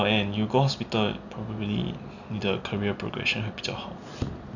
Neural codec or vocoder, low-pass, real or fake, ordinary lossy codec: none; 7.2 kHz; real; none